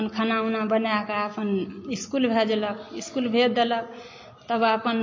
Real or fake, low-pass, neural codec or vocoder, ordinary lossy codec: real; 7.2 kHz; none; MP3, 32 kbps